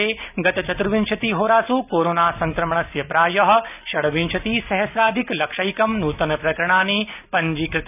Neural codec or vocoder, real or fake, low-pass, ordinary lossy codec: none; real; 3.6 kHz; none